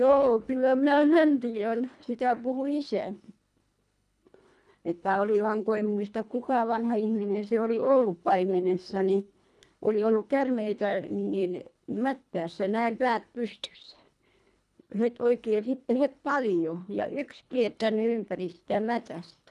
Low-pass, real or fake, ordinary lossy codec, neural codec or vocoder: none; fake; none; codec, 24 kHz, 1.5 kbps, HILCodec